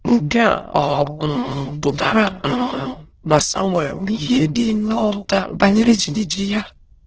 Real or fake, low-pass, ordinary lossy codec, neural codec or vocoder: fake; 7.2 kHz; Opus, 16 kbps; autoencoder, 22.05 kHz, a latent of 192 numbers a frame, VITS, trained on many speakers